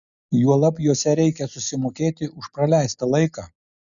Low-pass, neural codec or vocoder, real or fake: 7.2 kHz; none; real